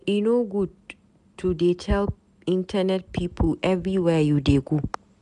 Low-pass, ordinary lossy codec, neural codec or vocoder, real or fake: 10.8 kHz; none; none; real